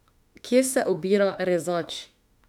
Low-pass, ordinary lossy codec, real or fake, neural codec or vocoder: 19.8 kHz; none; fake; autoencoder, 48 kHz, 32 numbers a frame, DAC-VAE, trained on Japanese speech